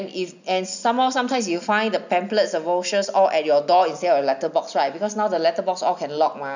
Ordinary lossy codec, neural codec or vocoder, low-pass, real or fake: none; none; 7.2 kHz; real